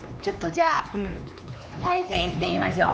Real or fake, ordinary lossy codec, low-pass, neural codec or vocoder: fake; none; none; codec, 16 kHz, 2 kbps, X-Codec, HuBERT features, trained on LibriSpeech